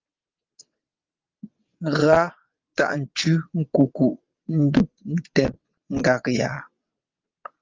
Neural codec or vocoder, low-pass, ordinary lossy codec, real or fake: vocoder, 22.05 kHz, 80 mel bands, WaveNeXt; 7.2 kHz; Opus, 32 kbps; fake